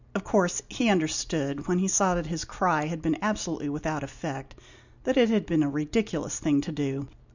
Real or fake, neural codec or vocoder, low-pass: real; none; 7.2 kHz